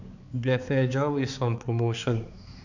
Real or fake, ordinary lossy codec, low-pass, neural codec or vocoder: fake; none; 7.2 kHz; codec, 16 kHz, 4 kbps, X-Codec, HuBERT features, trained on balanced general audio